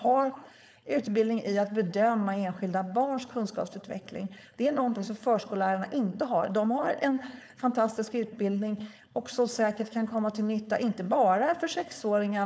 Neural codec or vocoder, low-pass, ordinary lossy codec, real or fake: codec, 16 kHz, 4.8 kbps, FACodec; none; none; fake